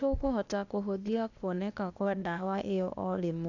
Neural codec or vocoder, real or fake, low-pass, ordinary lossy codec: codec, 16 kHz, 0.8 kbps, ZipCodec; fake; 7.2 kHz; none